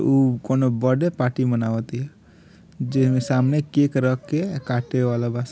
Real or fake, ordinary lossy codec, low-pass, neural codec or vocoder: real; none; none; none